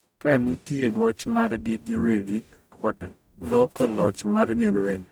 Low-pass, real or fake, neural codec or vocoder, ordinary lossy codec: none; fake; codec, 44.1 kHz, 0.9 kbps, DAC; none